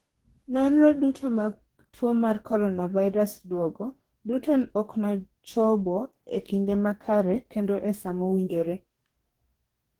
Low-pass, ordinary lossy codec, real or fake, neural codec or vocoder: 19.8 kHz; Opus, 16 kbps; fake; codec, 44.1 kHz, 2.6 kbps, DAC